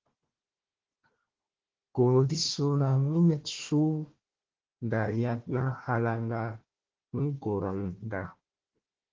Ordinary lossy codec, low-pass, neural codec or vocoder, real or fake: Opus, 16 kbps; 7.2 kHz; codec, 16 kHz, 1 kbps, FunCodec, trained on Chinese and English, 50 frames a second; fake